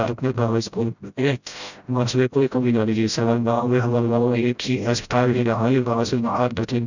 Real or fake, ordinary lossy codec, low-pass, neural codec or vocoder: fake; none; 7.2 kHz; codec, 16 kHz, 0.5 kbps, FreqCodec, smaller model